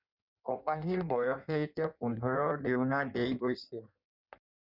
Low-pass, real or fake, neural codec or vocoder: 5.4 kHz; fake; codec, 16 kHz in and 24 kHz out, 1.1 kbps, FireRedTTS-2 codec